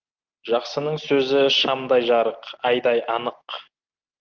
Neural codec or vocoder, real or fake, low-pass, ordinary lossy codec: none; real; 7.2 kHz; Opus, 32 kbps